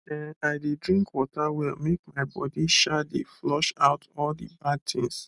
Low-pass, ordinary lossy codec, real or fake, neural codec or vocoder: 10.8 kHz; none; real; none